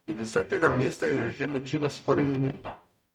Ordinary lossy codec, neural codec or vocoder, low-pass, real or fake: none; codec, 44.1 kHz, 0.9 kbps, DAC; 19.8 kHz; fake